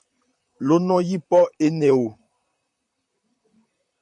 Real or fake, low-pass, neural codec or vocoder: fake; 10.8 kHz; vocoder, 44.1 kHz, 128 mel bands, Pupu-Vocoder